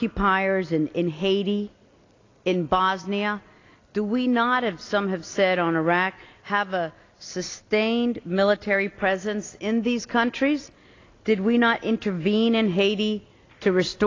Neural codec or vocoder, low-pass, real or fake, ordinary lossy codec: none; 7.2 kHz; real; AAC, 32 kbps